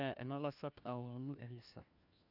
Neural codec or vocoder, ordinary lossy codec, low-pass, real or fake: codec, 16 kHz, 1 kbps, FunCodec, trained on LibriTTS, 50 frames a second; none; 5.4 kHz; fake